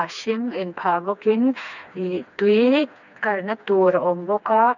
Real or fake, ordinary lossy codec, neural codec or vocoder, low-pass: fake; none; codec, 16 kHz, 2 kbps, FreqCodec, smaller model; 7.2 kHz